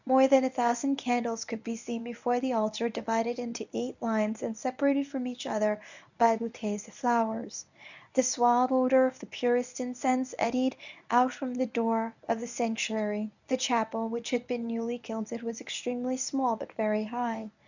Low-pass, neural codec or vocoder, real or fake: 7.2 kHz; codec, 24 kHz, 0.9 kbps, WavTokenizer, medium speech release version 1; fake